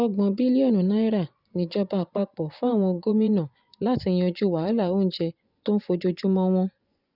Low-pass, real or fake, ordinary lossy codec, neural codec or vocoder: 5.4 kHz; fake; none; vocoder, 24 kHz, 100 mel bands, Vocos